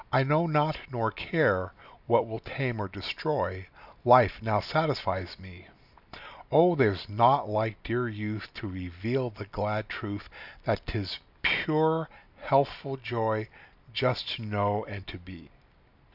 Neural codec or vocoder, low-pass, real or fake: none; 5.4 kHz; real